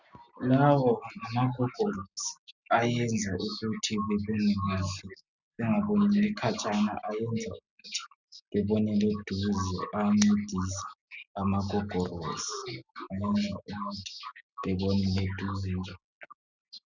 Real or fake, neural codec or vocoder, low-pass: real; none; 7.2 kHz